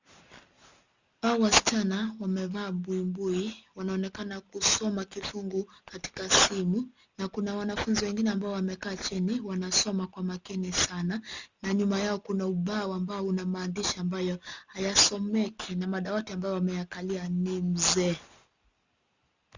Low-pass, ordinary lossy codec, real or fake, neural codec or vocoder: 7.2 kHz; Opus, 64 kbps; real; none